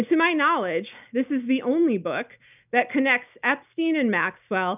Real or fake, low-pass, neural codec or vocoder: real; 3.6 kHz; none